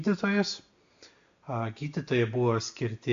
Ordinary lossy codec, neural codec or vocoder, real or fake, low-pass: AAC, 64 kbps; none; real; 7.2 kHz